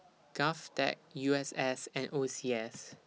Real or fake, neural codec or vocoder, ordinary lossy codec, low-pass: real; none; none; none